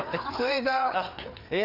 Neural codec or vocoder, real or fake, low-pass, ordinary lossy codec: codec, 16 kHz, 4 kbps, FreqCodec, larger model; fake; 5.4 kHz; none